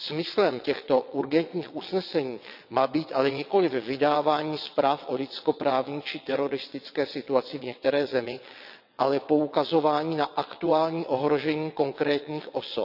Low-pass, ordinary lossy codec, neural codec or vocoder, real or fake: 5.4 kHz; none; vocoder, 22.05 kHz, 80 mel bands, WaveNeXt; fake